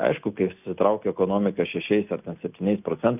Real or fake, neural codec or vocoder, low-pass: real; none; 3.6 kHz